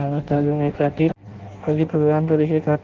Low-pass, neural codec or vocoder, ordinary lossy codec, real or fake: 7.2 kHz; codec, 16 kHz in and 24 kHz out, 0.6 kbps, FireRedTTS-2 codec; Opus, 16 kbps; fake